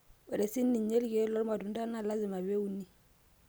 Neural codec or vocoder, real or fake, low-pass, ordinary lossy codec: none; real; none; none